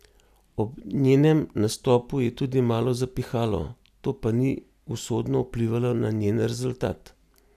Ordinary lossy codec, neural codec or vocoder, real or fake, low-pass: none; none; real; 14.4 kHz